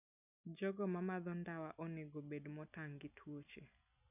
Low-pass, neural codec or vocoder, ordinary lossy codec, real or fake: 3.6 kHz; none; none; real